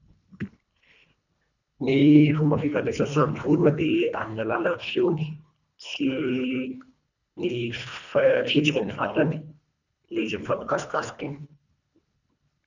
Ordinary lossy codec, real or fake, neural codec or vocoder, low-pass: none; fake; codec, 24 kHz, 1.5 kbps, HILCodec; 7.2 kHz